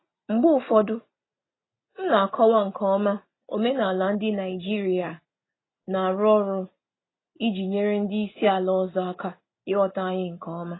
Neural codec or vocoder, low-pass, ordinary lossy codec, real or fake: none; 7.2 kHz; AAC, 16 kbps; real